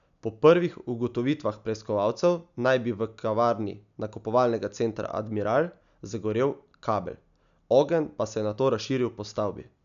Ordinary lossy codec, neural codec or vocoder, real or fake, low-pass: none; none; real; 7.2 kHz